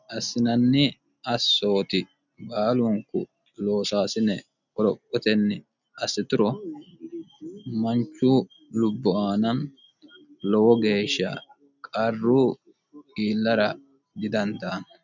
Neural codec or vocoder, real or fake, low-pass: none; real; 7.2 kHz